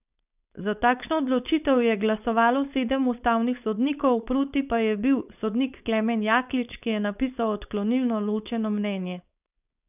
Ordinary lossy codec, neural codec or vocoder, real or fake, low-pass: none; codec, 16 kHz, 4.8 kbps, FACodec; fake; 3.6 kHz